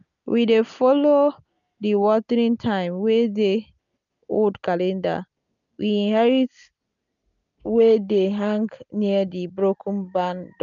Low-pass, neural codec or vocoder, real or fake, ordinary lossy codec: 7.2 kHz; none; real; none